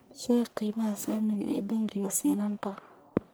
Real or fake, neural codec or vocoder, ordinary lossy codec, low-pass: fake; codec, 44.1 kHz, 1.7 kbps, Pupu-Codec; none; none